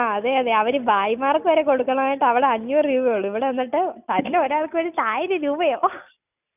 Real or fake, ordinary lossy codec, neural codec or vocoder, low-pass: real; none; none; 3.6 kHz